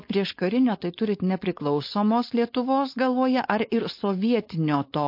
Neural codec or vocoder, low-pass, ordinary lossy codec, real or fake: codec, 16 kHz, 4.8 kbps, FACodec; 5.4 kHz; MP3, 32 kbps; fake